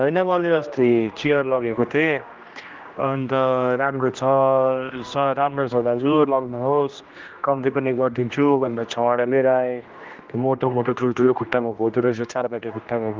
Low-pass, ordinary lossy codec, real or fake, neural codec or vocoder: 7.2 kHz; Opus, 32 kbps; fake; codec, 16 kHz, 1 kbps, X-Codec, HuBERT features, trained on general audio